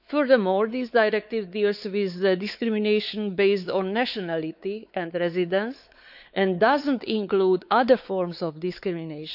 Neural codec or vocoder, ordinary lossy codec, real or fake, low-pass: codec, 16 kHz, 4 kbps, X-Codec, WavLM features, trained on Multilingual LibriSpeech; none; fake; 5.4 kHz